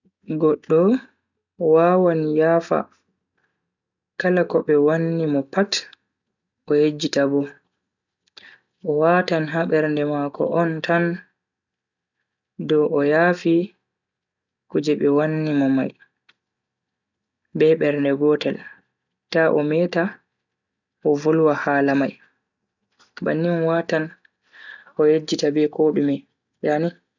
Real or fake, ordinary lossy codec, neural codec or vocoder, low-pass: real; none; none; 7.2 kHz